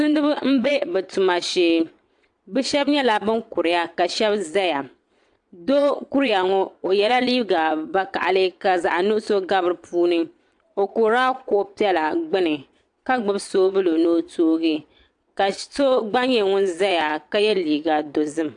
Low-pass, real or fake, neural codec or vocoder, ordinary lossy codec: 9.9 kHz; fake; vocoder, 22.05 kHz, 80 mel bands, Vocos; AAC, 64 kbps